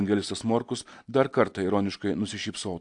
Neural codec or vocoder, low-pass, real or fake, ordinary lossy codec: none; 10.8 kHz; real; AAC, 64 kbps